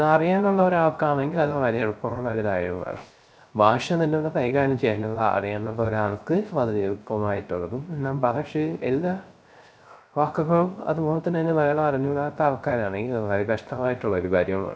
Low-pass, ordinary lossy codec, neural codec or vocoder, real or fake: none; none; codec, 16 kHz, 0.3 kbps, FocalCodec; fake